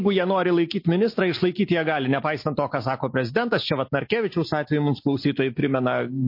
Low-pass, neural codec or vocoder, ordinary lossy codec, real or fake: 5.4 kHz; none; MP3, 32 kbps; real